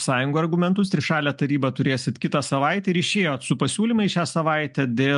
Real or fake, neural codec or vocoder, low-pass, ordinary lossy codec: real; none; 10.8 kHz; MP3, 64 kbps